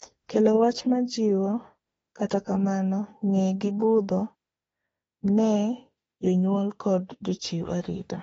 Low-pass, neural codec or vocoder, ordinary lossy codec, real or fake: 19.8 kHz; autoencoder, 48 kHz, 32 numbers a frame, DAC-VAE, trained on Japanese speech; AAC, 24 kbps; fake